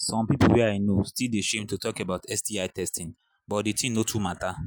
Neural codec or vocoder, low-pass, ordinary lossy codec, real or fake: none; none; none; real